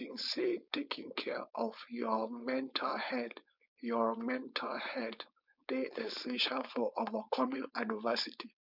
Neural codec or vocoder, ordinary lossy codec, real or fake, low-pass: codec, 16 kHz, 4.8 kbps, FACodec; none; fake; 5.4 kHz